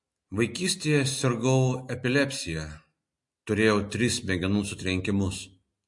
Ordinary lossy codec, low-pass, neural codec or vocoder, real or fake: MP3, 48 kbps; 10.8 kHz; none; real